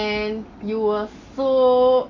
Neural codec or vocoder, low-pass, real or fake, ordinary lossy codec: none; 7.2 kHz; real; AAC, 48 kbps